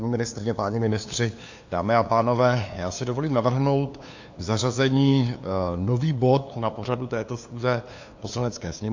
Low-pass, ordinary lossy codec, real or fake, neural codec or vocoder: 7.2 kHz; AAC, 48 kbps; fake; codec, 16 kHz, 2 kbps, FunCodec, trained on LibriTTS, 25 frames a second